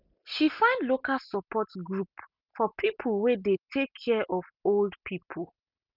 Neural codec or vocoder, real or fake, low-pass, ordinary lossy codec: none; real; 5.4 kHz; none